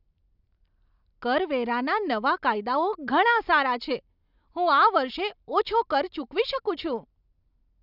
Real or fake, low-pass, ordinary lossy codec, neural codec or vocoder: real; 5.4 kHz; none; none